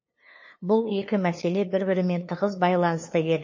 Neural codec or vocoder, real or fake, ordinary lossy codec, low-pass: codec, 16 kHz, 2 kbps, FunCodec, trained on LibriTTS, 25 frames a second; fake; MP3, 32 kbps; 7.2 kHz